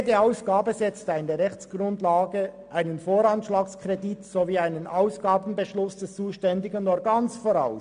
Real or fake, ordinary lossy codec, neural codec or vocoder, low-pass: real; MP3, 64 kbps; none; 9.9 kHz